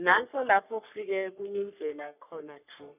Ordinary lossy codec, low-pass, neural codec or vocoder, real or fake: none; 3.6 kHz; codec, 44.1 kHz, 3.4 kbps, Pupu-Codec; fake